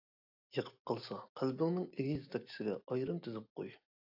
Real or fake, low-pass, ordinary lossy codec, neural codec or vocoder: real; 5.4 kHz; AAC, 48 kbps; none